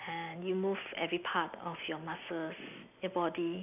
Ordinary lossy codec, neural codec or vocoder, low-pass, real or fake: none; none; 3.6 kHz; real